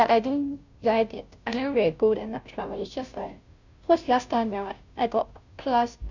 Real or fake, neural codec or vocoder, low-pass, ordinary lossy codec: fake; codec, 16 kHz, 0.5 kbps, FunCodec, trained on Chinese and English, 25 frames a second; 7.2 kHz; none